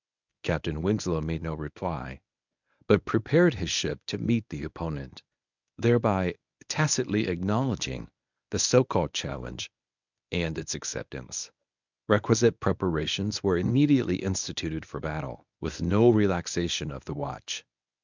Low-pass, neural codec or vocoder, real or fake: 7.2 kHz; codec, 24 kHz, 0.9 kbps, WavTokenizer, medium speech release version 1; fake